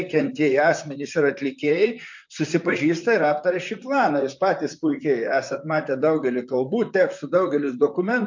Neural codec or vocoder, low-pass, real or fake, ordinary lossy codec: vocoder, 44.1 kHz, 128 mel bands, Pupu-Vocoder; 7.2 kHz; fake; MP3, 48 kbps